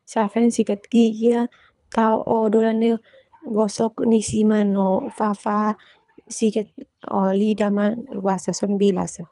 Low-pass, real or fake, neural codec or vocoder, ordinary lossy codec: 10.8 kHz; fake; codec, 24 kHz, 3 kbps, HILCodec; none